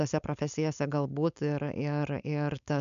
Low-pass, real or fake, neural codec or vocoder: 7.2 kHz; fake; codec, 16 kHz, 4.8 kbps, FACodec